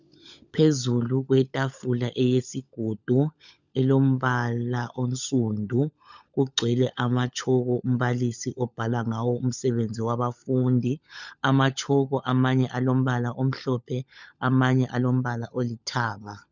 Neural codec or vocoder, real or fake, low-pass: codec, 16 kHz, 16 kbps, FunCodec, trained on LibriTTS, 50 frames a second; fake; 7.2 kHz